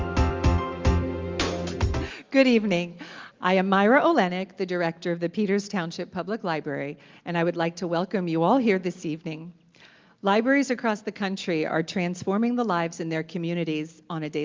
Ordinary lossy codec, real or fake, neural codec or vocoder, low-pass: Opus, 32 kbps; real; none; 7.2 kHz